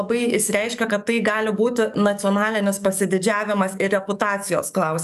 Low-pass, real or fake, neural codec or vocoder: 14.4 kHz; fake; codec, 44.1 kHz, 7.8 kbps, DAC